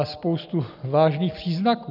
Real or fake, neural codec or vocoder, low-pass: real; none; 5.4 kHz